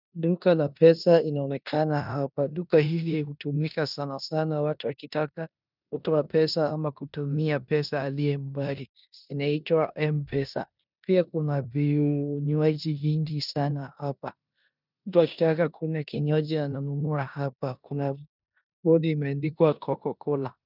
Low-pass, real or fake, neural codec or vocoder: 5.4 kHz; fake; codec, 16 kHz in and 24 kHz out, 0.9 kbps, LongCat-Audio-Codec, four codebook decoder